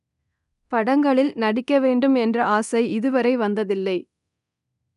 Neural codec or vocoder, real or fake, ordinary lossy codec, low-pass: codec, 24 kHz, 0.9 kbps, DualCodec; fake; none; 10.8 kHz